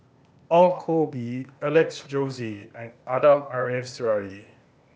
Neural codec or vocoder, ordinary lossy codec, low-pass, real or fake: codec, 16 kHz, 0.8 kbps, ZipCodec; none; none; fake